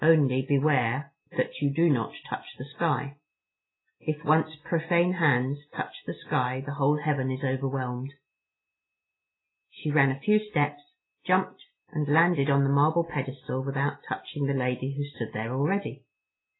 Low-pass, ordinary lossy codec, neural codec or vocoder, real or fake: 7.2 kHz; AAC, 16 kbps; none; real